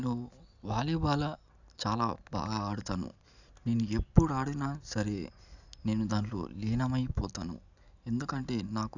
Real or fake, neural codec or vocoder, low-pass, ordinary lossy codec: real; none; 7.2 kHz; none